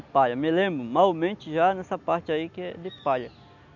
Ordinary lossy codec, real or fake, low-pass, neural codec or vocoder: none; real; 7.2 kHz; none